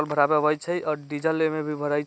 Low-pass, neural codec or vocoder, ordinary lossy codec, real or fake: none; none; none; real